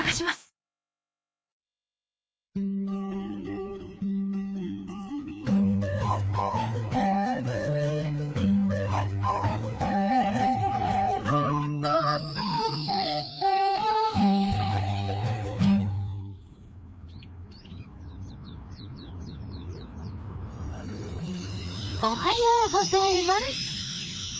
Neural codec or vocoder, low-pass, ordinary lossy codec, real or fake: codec, 16 kHz, 2 kbps, FreqCodec, larger model; none; none; fake